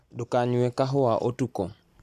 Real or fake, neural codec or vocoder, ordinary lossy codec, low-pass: real; none; none; 14.4 kHz